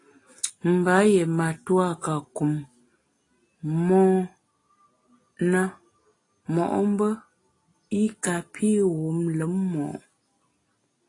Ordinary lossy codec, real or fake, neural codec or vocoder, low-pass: AAC, 32 kbps; real; none; 10.8 kHz